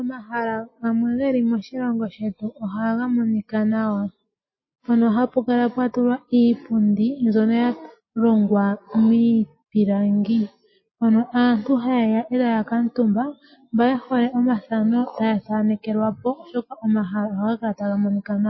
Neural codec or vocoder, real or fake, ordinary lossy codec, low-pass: none; real; MP3, 24 kbps; 7.2 kHz